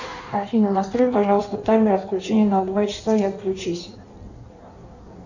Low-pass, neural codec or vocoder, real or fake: 7.2 kHz; codec, 16 kHz in and 24 kHz out, 1.1 kbps, FireRedTTS-2 codec; fake